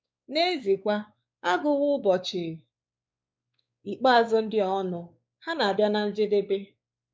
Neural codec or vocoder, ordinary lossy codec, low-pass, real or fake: codec, 16 kHz, 4 kbps, X-Codec, WavLM features, trained on Multilingual LibriSpeech; none; none; fake